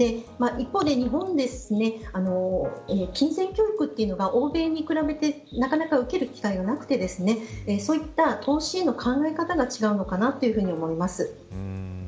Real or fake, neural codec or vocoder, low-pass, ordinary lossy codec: real; none; none; none